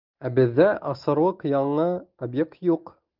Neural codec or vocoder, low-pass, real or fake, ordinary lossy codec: none; 5.4 kHz; real; Opus, 32 kbps